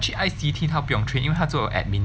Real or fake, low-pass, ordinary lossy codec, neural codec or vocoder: real; none; none; none